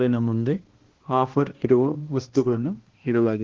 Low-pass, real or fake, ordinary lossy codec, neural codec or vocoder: 7.2 kHz; fake; Opus, 16 kbps; codec, 16 kHz, 1 kbps, X-Codec, HuBERT features, trained on balanced general audio